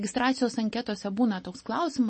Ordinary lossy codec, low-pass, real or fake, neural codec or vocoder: MP3, 32 kbps; 10.8 kHz; real; none